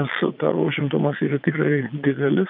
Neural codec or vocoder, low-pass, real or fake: vocoder, 22.05 kHz, 80 mel bands, Vocos; 5.4 kHz; fake